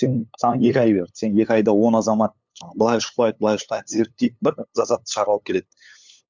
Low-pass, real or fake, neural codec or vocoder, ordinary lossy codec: 7.2 kHz; fake; codec, 16 kHz, 8 kbps, FunCodec, trained on LibriTTS, 25 frames a second; MP3, 64 kbps